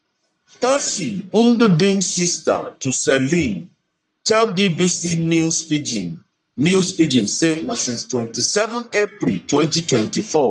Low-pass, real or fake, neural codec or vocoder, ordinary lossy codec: 10.8 kHz; fake; codec, 44.1 kHz, 1.7 kbps, Pupu-Codec; none